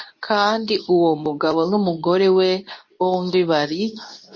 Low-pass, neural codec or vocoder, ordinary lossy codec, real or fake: 7.2 kHz; codec, 24 kHz, 0.9 kbps, WavTokenizer, medium speech release version 2; MP3, 32 kbps; fake